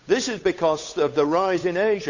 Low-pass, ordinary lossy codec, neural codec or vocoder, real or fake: 7.2 kHz; none; codec, 16 kHz, 8 kbps, FunCodec, trained on Chinese and English, 25 frames a second; fake